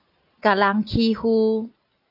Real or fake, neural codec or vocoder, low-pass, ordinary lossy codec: real; none; 5.4 kHz; AAC, 48 kbps